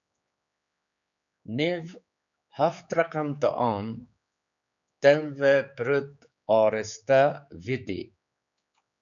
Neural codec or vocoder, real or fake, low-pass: codec, 16 kHz, 4 kbps, X-Codec, HuBERT features, trained on general audio; fake; 7.2 kHz